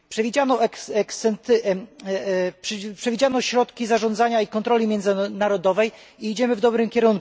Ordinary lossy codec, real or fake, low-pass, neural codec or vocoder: none; real; none; none